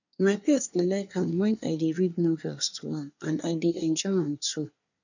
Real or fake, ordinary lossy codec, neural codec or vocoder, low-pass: fake; none; codec, 24 kHz, 1 kbps, SNAC; 7.2 kHz